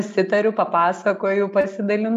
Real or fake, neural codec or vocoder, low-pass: real; none; 14.4 kHz